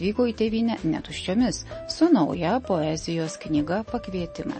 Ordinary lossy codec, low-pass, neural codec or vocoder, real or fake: MP3, 32 kbps; 10.8 kHz; none; real